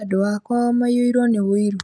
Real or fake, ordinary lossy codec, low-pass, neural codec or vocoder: real; none; none; none